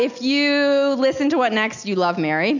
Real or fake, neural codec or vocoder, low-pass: real; none; 7.2 kHz